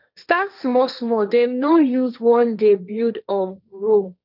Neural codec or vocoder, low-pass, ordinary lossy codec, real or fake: codec, 16 kHz, 1.1 kbps, Voila-Tokenizer; 5.4 kHz; none; fake